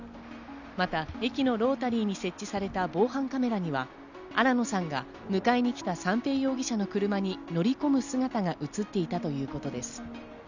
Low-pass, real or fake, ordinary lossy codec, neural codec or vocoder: 7.2 kHz; real; none; none